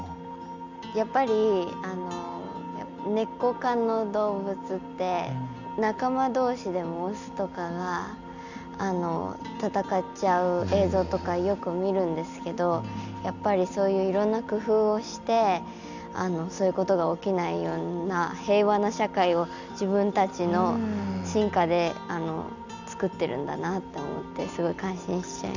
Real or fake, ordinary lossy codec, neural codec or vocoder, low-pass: real; none; none; 7.2 kHz